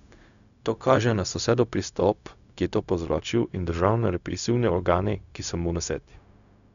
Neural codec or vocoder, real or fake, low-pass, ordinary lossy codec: codec, 16 kHz, 0.4 kbps, LongCat-Audio-Codec; fake; 7.2 kHz; none